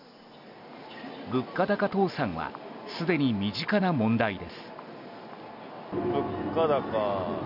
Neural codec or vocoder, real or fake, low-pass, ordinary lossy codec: none; real; 5.4 kHz; none